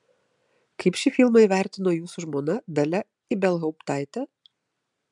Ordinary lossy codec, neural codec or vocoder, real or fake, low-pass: MP3, 96 kbps; none; real; 10.8 kHz